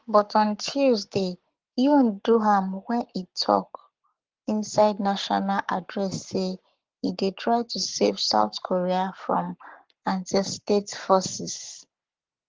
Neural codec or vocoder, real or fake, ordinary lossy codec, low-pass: codec, 44.1 kHz, 7.8 kbps, Pupu-Codec; fake; Opus, 16 kbps; 7.2 kHz